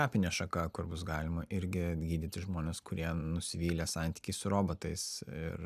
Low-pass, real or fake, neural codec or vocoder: 14.4 kHz; real; none